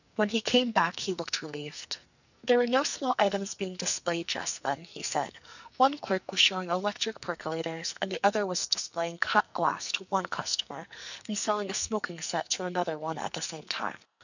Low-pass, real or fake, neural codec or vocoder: 7.2 kHz; fake; codec, 44.1 kHz, 2.6 kbps, SNAC